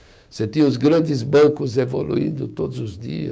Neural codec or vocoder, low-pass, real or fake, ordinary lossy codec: codec, 16 kHz, 6 kbps, DAC; none; fake; none